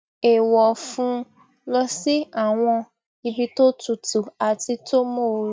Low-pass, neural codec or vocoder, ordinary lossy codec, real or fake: none; none; none; real